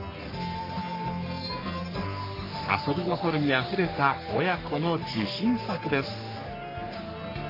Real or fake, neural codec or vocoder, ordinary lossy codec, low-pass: fake; codec, 44.1 kHz, 3.4 kbps, Pupu-Codec; AAC, 32 kbps; 5.4 kHz